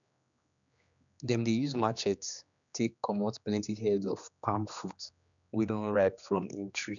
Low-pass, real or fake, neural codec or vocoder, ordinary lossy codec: 7.2 kHz; fake; codec, 16 kHz, 2 kbps, X-Codec, HuBERT features, trained on general audio; none